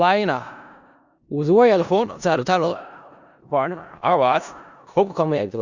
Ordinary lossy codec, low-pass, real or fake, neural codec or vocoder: Opus, 64 kbps; 7.2 kHz; fake; codec, 16 kHz in and 24 kHz out, 0.4 kbps, LongCat-Audio-Codec, four codebook decoder